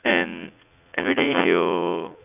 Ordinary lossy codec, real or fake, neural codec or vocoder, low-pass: none; fake; vocoder, 44.1 kHz, 80 mel bands, Vocos; 3.6 kHz